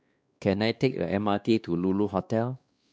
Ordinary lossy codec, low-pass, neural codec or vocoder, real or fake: none; none; codec, 16 kHz, 2 kbps, X-Codec, WavLM features, trained on Multilingual LibriSpeech; fake